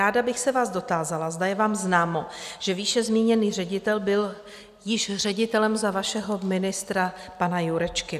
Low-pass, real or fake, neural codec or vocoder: 14.4 kHz; real; none